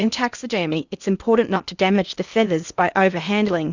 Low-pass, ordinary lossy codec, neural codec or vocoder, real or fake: 7.2 kHz; Opus, 64 kbps; codec, 16 kHz in and 24 kHz out, 0.8 kbps, FocalCodec, streaming, 65536 codes; fake